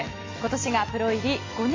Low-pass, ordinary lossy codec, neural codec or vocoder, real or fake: 7.2 kHz; AAC, 32 kbps; none; real